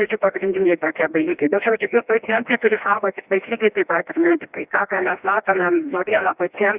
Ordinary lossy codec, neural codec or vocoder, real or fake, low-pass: Opus, 64 kbps; codec, 16 kHz, 1 kbps, FreqCodec, smaller model; fake; 3.6 kHz